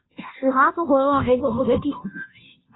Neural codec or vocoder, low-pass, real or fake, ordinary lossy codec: codec, 16 kHz, 0.5 kbps, FunCodec, trained on Chinese and English, 25 frames a second; 7.2 kHz; fake; AAC, 16 kbps